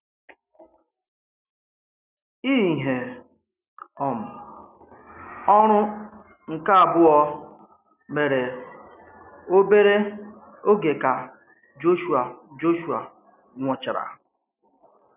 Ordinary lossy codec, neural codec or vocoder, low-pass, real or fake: none; none; 3.6 kHz; real